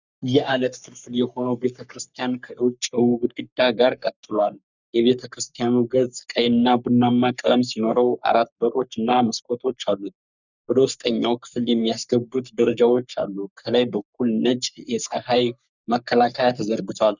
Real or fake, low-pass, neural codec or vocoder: fake; 7.2 kHz; codec, 44.1 kHz, 3.4 kbps, Pupu-Codec